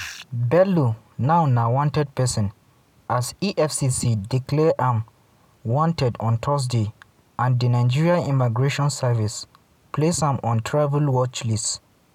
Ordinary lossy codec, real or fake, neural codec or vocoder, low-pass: none; real; none; 19.8 kHz